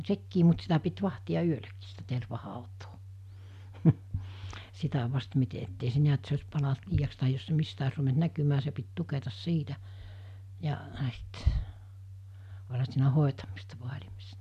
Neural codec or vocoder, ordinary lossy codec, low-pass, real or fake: none; MP3, 96 kbps; 14.4 kHz; real